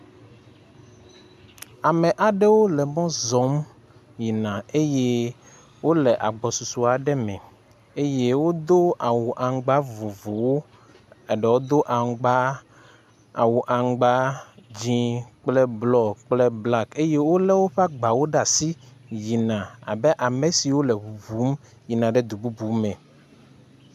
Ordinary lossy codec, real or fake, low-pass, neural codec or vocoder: MP3, 96 kbps; real; 14.4 kHz; none